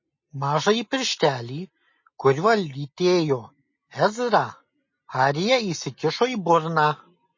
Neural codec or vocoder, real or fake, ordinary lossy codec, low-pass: none; real; MP3, 32 kbps; 7.2 kHz